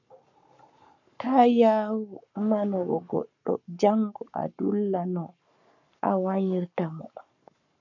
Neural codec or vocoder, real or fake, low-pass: codec, 44.1 kHz, 7.8 kbps, Pupu-Codec; fake; 7.2 kHz